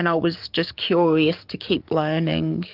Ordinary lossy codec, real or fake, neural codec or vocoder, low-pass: Opus, 24 kbps; fake; codec, 44.1 kHz, 7.8 kbps, DAC; 5.4 kHz